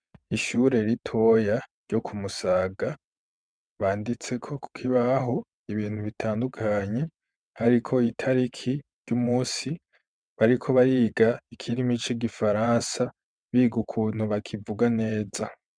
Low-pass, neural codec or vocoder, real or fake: 9.9 kHz; vocoder, 22.05 kHz, 80 mel bands, WaveNeXt; fake